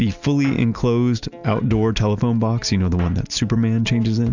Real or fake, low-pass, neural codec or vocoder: real; 7.2 kHz; none